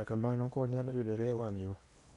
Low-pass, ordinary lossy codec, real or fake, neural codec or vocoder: 10.8 kHz; none; fake; codec, 16 kHz in and 24 kHz out, 0.8 kbps, FocalCodec, streaming, 65536 codes